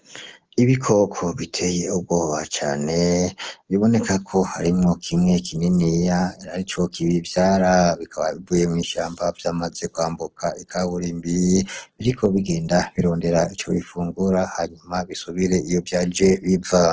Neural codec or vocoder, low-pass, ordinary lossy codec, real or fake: none; 7.2 kHz; Opus, 16 kbps; real